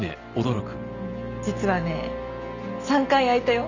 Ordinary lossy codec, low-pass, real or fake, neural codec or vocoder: none; 7.2 kHz; real; none